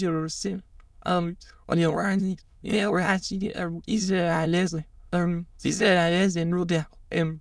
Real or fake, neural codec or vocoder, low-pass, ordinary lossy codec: fake; autoencoder, 22.05 kHz, a latent of 192 numbers a frame, VITS, trained on many speakers; none; none